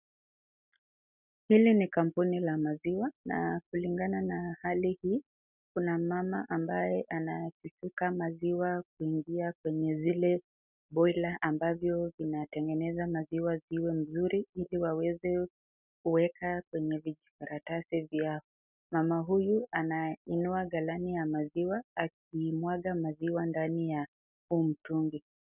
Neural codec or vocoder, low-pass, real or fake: none; 3.6 kHz; real